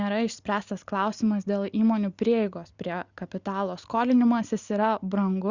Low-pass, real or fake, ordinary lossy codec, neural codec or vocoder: 7.2 kHz; real; Opus, 64 kbps; none